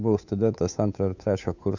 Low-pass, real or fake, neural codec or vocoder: 7.2 kHz; fake; codec, 16 kHz, 8 kbps, FunCodec, trained on Chinese and English, 25 frames a second